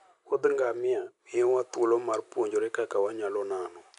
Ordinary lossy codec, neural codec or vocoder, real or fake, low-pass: none; none; real; 10.8 kHz